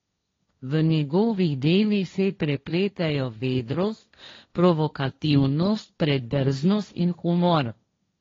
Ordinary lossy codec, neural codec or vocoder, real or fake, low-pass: AAC, 32 kbps; codec, 16 kHz, 1.1 kbps, Voila-Tokenizer; fake; 7.2 kHz